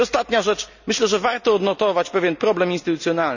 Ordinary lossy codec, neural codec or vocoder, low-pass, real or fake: none; none; 7.2 kHz; real